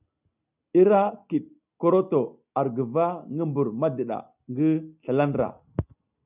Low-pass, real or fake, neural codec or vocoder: 3.6 kHz; real; none